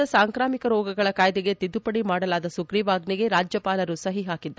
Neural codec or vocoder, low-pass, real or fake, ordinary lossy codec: none; none; real; none